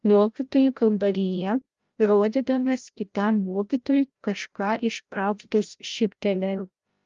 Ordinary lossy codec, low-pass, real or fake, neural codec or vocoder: Opus, 32 kbps; 7.2 kHz; fake; codec, 16 kHz, 0.5 kbps, FreqCodec, larger model